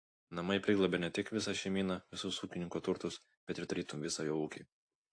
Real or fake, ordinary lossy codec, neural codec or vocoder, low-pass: real; AAC, 48 kbps; none; 9.9 kHz